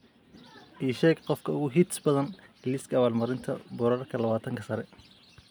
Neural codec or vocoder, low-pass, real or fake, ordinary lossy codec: none; none; real; none